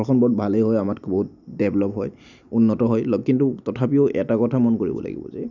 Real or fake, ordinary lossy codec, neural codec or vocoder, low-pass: real; none; none; 7.2 kHz